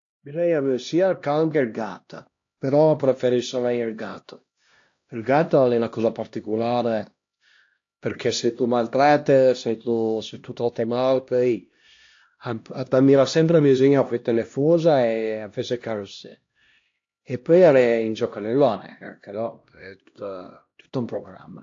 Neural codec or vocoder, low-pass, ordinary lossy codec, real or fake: codec, 16 kHz, 1 kbps, X-Codec, HuBERT features, trained on LibriSpeech; 7.2 kHz; AAC, 48 kbps; fake